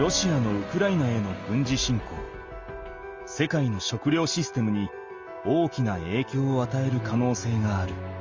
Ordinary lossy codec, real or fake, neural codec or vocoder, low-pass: Opus, 32 kbps; real; none; 7.2 kHz